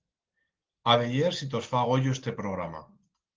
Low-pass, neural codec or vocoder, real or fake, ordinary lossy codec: 7.2 kHz; none; real; Opus, 16 kbps